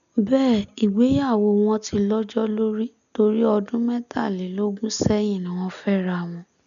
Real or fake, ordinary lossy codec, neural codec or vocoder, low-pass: real; none; none; 7.2 kHz